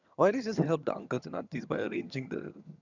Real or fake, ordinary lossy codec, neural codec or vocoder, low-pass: fake; none; vocoder, 22.05 kHz, 80 mel bands, HiFi-GAN; 7.2 kHz